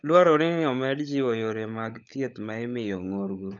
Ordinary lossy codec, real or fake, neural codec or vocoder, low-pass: none; fake; codec, 16 kHz, 16 kbps, FunCodec, trained on LibriTTS, 50 frames a second; 7.2 kHz